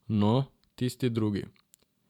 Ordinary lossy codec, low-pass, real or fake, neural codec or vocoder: none; 19.8 kHz; fake; vocoder, 44.1 kHz, 128 mel bands every 512 samples, BigVGAN v2